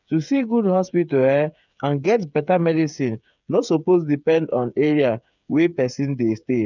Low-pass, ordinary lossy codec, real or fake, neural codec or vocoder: 7.2 kHz; none; fake; codec, 16 kHz, 8 kbps, FreqCodec, smaller model